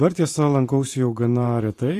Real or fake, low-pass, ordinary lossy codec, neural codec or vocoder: fake; 14.4 kHz; AAC, 48 kbps; vocoder, 44.1 kHz, 128 mel bands every 512 samples, BigVGAN v2